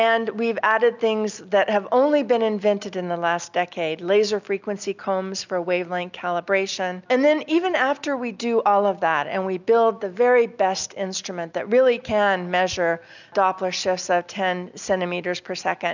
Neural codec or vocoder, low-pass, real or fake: none; 7.2 kHz; real